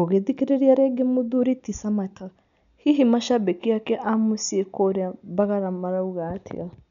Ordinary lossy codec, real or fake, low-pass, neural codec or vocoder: none; real; 7.2 kHz; none